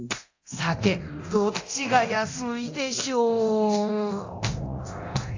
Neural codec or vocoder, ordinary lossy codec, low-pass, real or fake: codec, 24 kHz, 0.9 kbps, DualCodec; AAC, 48 kbps; 7.2 kHz; fake